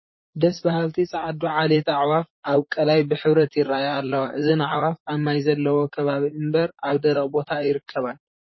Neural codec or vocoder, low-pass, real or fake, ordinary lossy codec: vocoder, 44.1 kHz, 128 mel bands, Pupu-Vocoder; 7.2 kHz; fake; MP3, 24 kbps